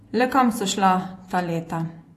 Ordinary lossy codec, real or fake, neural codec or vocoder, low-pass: AAC, 64 kbps; fake; vocoder, 48 kHz, 128 mel bands, Vocos; 14.4 kHz